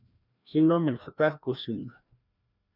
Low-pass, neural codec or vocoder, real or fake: 5.4 kHz; codec, 16 kHz, 1 kbps, FreqCodec, larger model; fake